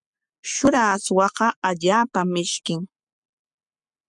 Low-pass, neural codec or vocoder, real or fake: 10.8 kHz; codec, 44.1 kHz, 7.8 kbps, Pupu-Codec; fake